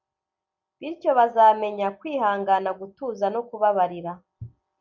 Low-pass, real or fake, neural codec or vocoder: 7.2 kHz; real; none